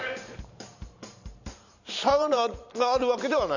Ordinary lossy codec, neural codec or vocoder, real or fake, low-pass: none; vocoder, 44.1 kHz, 128 mel bands every 512 samples, BigVGAN v2; fake; 7.2 kHz